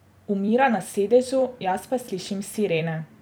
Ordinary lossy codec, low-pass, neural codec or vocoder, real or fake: none; none; vocoder, 44.1 kHz, 128 mel bands every 256 samples, BigVGAN v2; fake